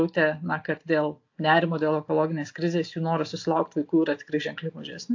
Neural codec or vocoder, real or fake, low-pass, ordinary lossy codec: none; real; 7.2 kHz; AAC, 48 kbps